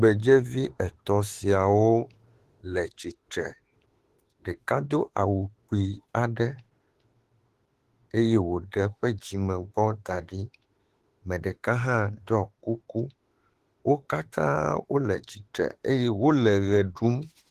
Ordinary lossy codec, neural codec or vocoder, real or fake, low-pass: Opus, 16 kbps; autoencoder, 48 kHz, 32 numbers a frame, DAC-VAE, trained on Japanese speech; fake; 14.4 kHz